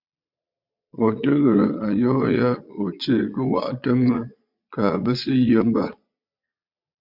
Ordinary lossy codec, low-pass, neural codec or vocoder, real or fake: MP3, 48 kbps; 5.4 kHz; vocoder, 22.05 kHz, 80 mel bands, Vocos; fake